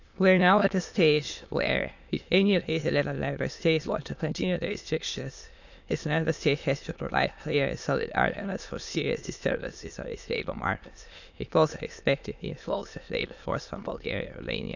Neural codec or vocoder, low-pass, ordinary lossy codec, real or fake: autoencoder, 22.05 kHz, a latent of 192 numbers a frame, VITS, trained on many speakers; 7.2 kHz; none; fake